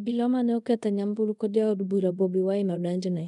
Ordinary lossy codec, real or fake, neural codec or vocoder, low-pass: none; fake; codec, 24 kHz, 0.5 kbps, DualCodec; 10.8 kHz